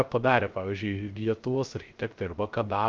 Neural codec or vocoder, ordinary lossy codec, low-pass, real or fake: codec, 16 kHz, 0.3 kbps, FocalCodec; Opus, 24 kbps; 7.2 kHz; fake